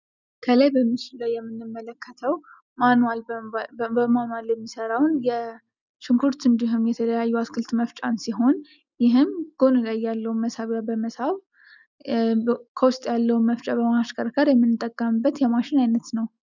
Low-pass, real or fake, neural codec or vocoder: 7.2 kHz; real; none